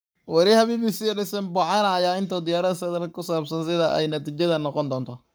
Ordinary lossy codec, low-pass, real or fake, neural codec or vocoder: none; none; fake; codec, 44.1 kHz, 7.8 kbps, Pupu-Codec